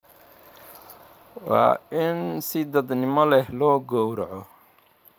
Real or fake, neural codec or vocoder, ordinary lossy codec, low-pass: fake; vocoder, 44.1 kHz, 128 mel bands every 512 samples, BigVGAN v2; none; none